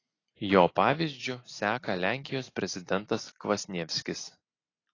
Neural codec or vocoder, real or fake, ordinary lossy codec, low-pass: none; real; AAC, 32 kbps; 7.2 kHz